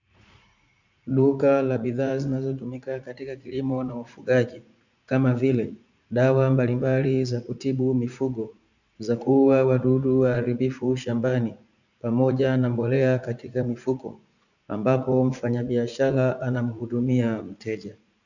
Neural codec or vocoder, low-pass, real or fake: vocoder, 44.1 kHz, 80 mel bands, Vocos; 7.2 kHz; fake